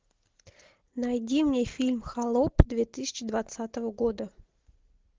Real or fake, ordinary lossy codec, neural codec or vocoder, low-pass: real; Opus, 16 kbps; none; 7.2 kHz